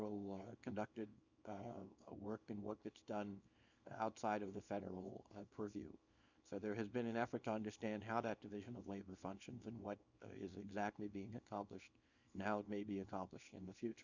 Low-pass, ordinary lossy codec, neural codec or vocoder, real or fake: 7.2 kHz; Opus, 64 kbps; codec, 24 kHz, 0.9 kbps, WavTokenizer, small release; fake